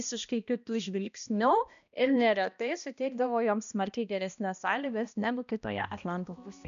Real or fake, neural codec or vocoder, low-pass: fake; codec, 16 kHz, 1 kbps, X-Codec, HuBERT features, trained on balanced general audio; 7.2 kHz